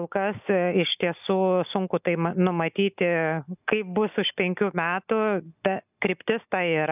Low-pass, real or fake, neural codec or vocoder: 3.6 kHz; real; none